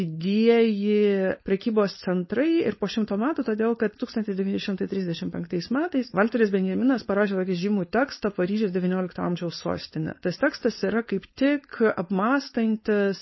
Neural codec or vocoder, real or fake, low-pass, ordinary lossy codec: codec, 16 kHz, 4.8 kbps, FACodec; fake; 7.2 kHz; MP3, 24 kbps